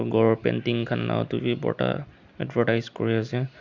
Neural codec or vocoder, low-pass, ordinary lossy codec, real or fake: none; 7.2 kHz; Opus, 64 kbps; real